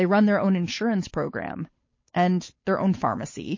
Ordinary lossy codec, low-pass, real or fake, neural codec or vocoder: MP3, 32 kbps; 7.2 kHz; fake; codec, 16 kHz, 8 kbps, FunCodec, trained on Chinese and English, 25 frames a second